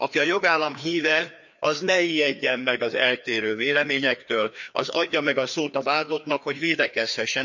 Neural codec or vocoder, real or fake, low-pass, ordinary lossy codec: codec, 16 kHz, 2 kbps, FreqCodec, larger model; fake; 7.2 kHz; none